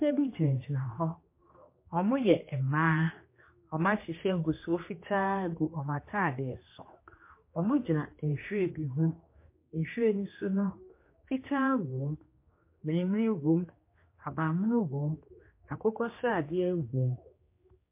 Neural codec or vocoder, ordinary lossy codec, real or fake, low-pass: codec, 16 kHz, 2 kbps, X-Codec, HuBERT features, trained on general audio; MP3, 24 kbps; fake; 3.6 kHz